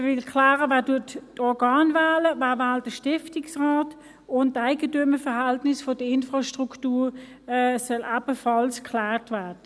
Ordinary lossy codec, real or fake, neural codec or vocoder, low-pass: none; real; none; none